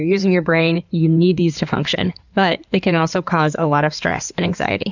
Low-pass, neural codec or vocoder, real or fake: 7.2 kHz; codec, 16 kHz in and 24 kHz out, 2.2 kbps, FireRedTTS-2 codec; fake